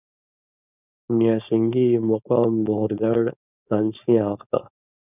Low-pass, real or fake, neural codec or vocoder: 3.6 kHz; fake; codec, 16 kHz, 4.8 kbps, FACodec